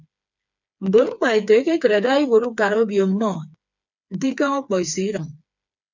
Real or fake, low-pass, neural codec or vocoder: fake; 7.2 kHz; codec, 16 kHz, 4 kbps, FreqCodec, smaller model